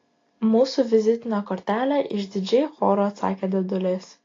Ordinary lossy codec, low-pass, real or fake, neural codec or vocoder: AAC, 32 kbps; 7.2 kHz; real; none